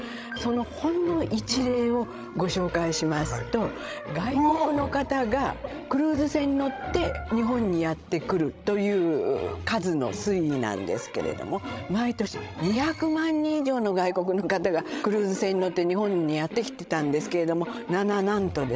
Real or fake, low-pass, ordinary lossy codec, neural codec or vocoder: fake; none; none; codec, 16 kHz, 16 kbps, FreqCodec, larger model